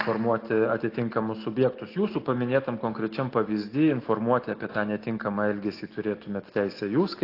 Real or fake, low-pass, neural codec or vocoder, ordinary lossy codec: real; 5.4 kHz; none; AAC, 32 kbps